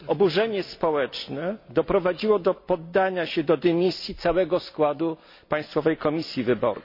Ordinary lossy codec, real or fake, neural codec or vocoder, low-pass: MP3, 32 kbps; real; none; 5.4 kHz